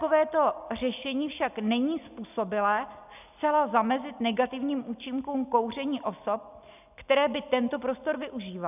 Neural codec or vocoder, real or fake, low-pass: none; real; 3.6 kHz